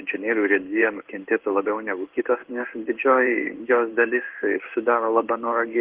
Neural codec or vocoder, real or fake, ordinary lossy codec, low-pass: codec, 16 kHz in and 24 kHz out, 1 kbps, XY-Tokenizer; fake; Opus, 16 kbps; 3.6 kHz